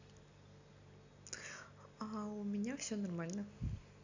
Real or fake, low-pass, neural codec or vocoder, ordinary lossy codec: real; 7.2 kHz; none; AAC, 48 kbps